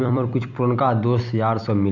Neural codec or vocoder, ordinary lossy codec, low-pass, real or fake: vocoder, 44.1 kHz, 128 mel bands every 256 samples, BigVGAN v2; none; 7.2 kHz; fake